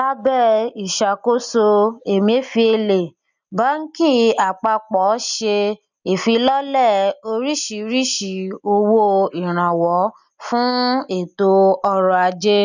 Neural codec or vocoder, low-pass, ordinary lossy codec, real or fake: none; 7.2 kHz; none; real